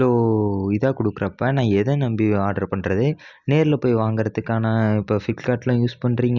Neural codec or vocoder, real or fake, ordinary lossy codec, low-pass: none; real; none; 7.2 kHz